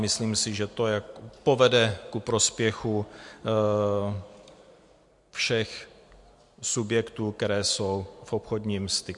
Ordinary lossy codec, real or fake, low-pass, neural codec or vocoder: MP3, 64 kbps; real; 10.8 kHz; none